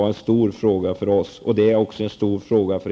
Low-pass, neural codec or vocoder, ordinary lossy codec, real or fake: none; none; none; real